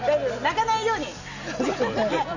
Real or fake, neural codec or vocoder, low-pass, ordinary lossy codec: real; none; 7.2 kHz; none